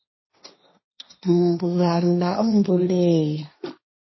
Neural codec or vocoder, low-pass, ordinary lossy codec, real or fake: codec, 16 kHz, 1.1 kbps, Voila-Tokenizer; 7.2 kHz; MP3, 24 kbps; fake